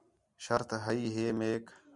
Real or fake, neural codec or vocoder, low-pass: real; none; 10.8 kHz